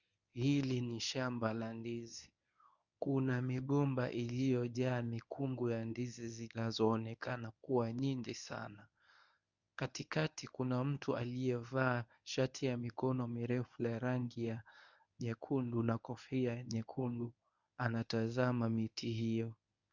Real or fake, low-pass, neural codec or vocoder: fake; 7.2 kHz; codec, 24 kHz, 0.9 kbps, WavTokenizer, medium speech release version 1